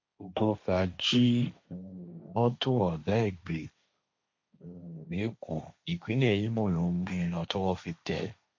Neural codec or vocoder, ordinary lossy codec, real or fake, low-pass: codec, 16 kHz, 1.1 kbps, Voila-Tokenizer; MP3, 48 kbps; fake; 7.2 kHz